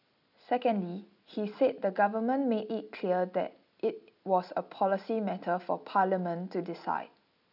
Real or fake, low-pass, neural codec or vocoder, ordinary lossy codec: real; 5.4 kHz; none; none